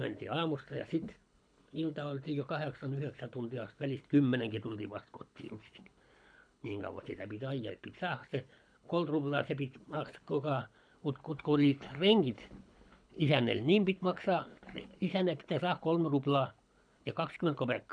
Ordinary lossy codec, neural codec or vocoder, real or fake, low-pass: none; codec, 24 kHz, 6 kbps, HILCodec; fake; 9.9 kHz